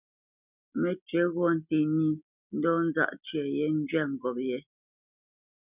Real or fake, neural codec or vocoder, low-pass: real; none; 3.6 kHz